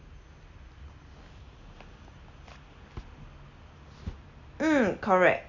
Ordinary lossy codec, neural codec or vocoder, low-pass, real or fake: none; none; 7.2 kHz; real